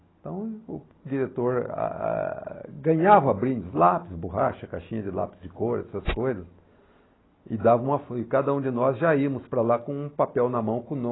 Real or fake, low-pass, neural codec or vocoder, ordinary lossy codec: real; 7.2 kHz; none; AAC, 16 kbps